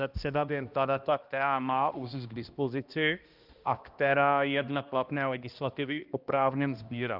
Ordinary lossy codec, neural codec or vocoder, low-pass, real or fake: Opus, 24 kbps; codec, 16 kHz, 1 kbps, X-Codec, HuBERT features, trained on balanced general audio; 5.4 kHz; fake